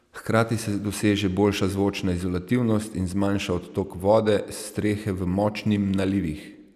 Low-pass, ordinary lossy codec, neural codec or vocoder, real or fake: 14.4 kHz; none; none; real